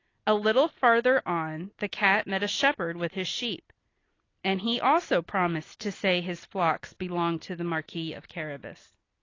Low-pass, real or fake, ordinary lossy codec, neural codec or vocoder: 7.2 kHz; real; AAC, 32 kbps; none